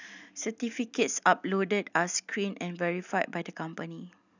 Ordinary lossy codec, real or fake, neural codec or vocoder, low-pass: none; real; none; 7.2 kHz